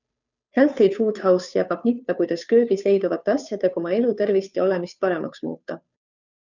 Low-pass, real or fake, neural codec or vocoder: 7.2 kHz; fake; codec, 16 kHz, 2 kbps, FunCodec, trained on Chinese and English, 25 frames a second